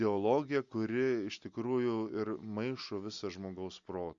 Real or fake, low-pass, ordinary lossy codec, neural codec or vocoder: real; 7.2 kHz; Opus, 64 kbps; none